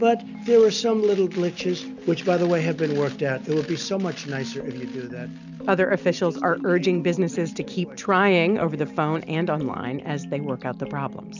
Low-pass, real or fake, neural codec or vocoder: 7.2 kHz; real; none